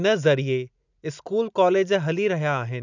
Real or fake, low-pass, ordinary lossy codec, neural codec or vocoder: real; 7.2 kHz; none; none